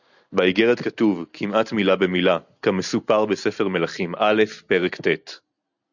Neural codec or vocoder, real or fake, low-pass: none; real; 7.2 kHz